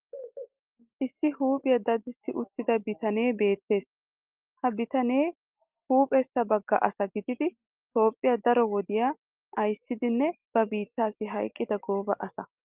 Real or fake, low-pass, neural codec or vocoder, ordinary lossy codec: real; 3.6 kHz; none; Opus, 32 kbps